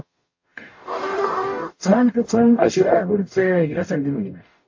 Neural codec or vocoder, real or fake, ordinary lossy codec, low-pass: codec, 44.1 kHz, 0.9 kbps, DAC; fake; MP3, 32 kbps; 7.2 kHz